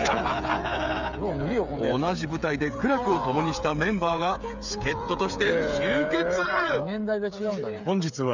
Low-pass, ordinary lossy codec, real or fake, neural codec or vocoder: 7.2 kHz; none; fake; codec, 16 kHz, 8 kbps, FreqCodec, smaller model